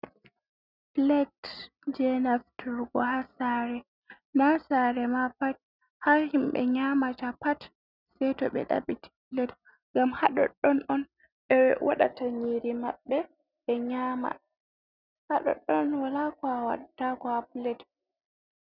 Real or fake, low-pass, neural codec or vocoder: real; 5.4 kHz; none